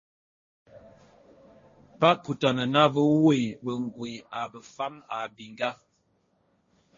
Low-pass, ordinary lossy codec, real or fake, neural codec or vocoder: 7.2 kHz; MP3, 32 kbps; fake; codec, 16 kHz, 1.1 kbps, Voila-Tokenizer